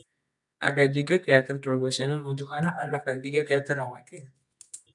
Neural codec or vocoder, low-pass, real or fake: codec, 24 kHz, 0.9 kbps, WavTokenizer, medium music audio release; 10.8 kHz; fake